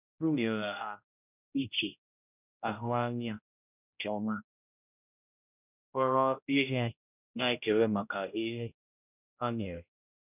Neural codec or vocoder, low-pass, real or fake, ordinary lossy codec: codec, 16 kHz, 0.5 kbps, X-Codec, HuBERT features, trained on general audio; 3.6 kHz; fake; none